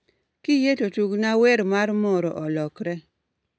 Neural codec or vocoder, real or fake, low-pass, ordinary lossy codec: none; real; none; none